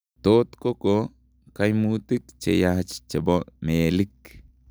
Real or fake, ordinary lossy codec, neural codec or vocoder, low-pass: real; none; none; none